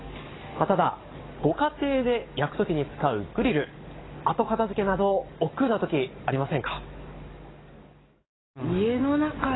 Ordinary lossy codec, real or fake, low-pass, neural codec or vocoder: AAC, 16 kbps; fake; 7.2 kHz; codec, 44.1 kHz, 7.8 kbps, DAC